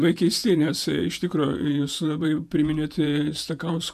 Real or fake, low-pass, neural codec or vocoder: fake; 14.4 kHz; vocoder, 48 kHz, 128 mel bands, Vocos